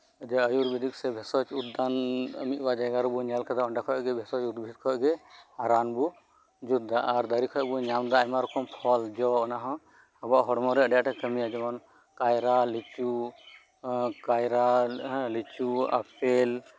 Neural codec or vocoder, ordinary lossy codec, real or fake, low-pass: none; none; real; none